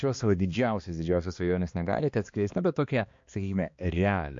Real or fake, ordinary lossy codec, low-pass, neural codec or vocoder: fake; MP3, 48 kbps; 7.2 kHz; codec, 16 kHz, 2 kbps, X-Codec, HuBERT features, trained on balanced general audio